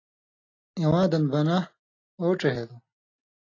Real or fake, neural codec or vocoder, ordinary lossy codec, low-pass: real; none; AAC, 32 kbps; 7.2 kHz